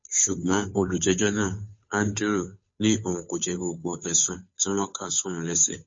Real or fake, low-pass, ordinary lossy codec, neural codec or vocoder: fake; 7.2 kHz; MP3, 32 kbps; codec, 16 kHz, 2 kbps, FunCodec, trained on Chinese and English, 25 frames a second